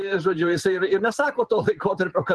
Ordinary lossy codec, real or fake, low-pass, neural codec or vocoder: Opus, 16 kbps; fake; 10.8 kHz; vocoder, 44.1 kHz, 128 mel bands every 512 samples, BigVGAN v2